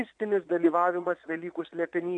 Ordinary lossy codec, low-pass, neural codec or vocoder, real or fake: MP3, 96 kbps; 9.9 kHz; vocoder, 22.05 kHz, 80 mel bands, Vocos; fake